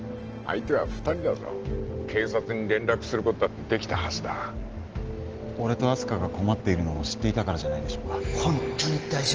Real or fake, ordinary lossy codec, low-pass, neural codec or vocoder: real; Opus, 16 kbps; 7.2 kHz; none